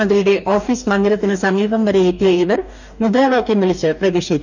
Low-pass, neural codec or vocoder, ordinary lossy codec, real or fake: 7.2 kHz; codec, 44.1 kHz, 2.6 kbps, DAC; none; fake